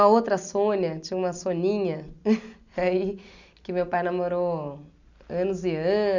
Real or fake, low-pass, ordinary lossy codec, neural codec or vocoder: real; 7.2 kHz; none; none